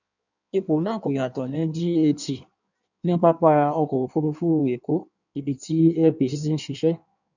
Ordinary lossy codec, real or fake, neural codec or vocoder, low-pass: none; fake; codec, 16 kHz in and 24 kHz out, 1.1 kbps, FireRedTTS-2 codec; 7.2 kHz